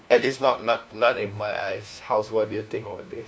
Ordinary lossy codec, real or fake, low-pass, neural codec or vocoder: none; fake; none; codec, 16 kHz, 1 kbps, FunCodec, trained on LibriTTS, 50 frames a second